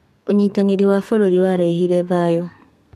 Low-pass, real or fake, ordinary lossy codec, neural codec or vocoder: 14.4 kHz; fake; none; codec, 32 kHz, 1.9 kbps, SNAC